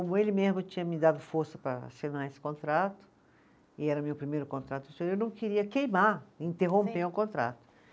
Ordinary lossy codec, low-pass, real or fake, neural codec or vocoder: none; none; real; none